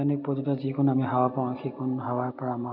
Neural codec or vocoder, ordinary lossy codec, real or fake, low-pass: none; none; real; 5.4 kHz